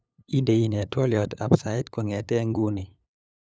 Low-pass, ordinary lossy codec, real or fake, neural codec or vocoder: none; none; fake; codec, 16 kHz, 8 kbps, FunCodec, trained on LibriTTS, 25 frames a second